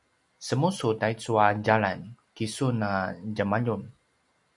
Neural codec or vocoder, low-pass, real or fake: none; 10.8 kHz; real